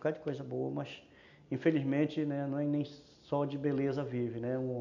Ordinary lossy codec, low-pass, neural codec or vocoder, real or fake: none; 7.2 kHz; none; real